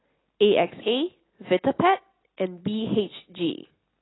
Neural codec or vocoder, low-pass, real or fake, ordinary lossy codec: none; 7.2 kHz; real; AAC, 16 kbps